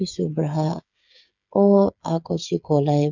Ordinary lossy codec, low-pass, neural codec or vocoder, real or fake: none; 7.2 kHz; codec, 16 kHz, 8 kbps, FreqCodec, smaller model; fake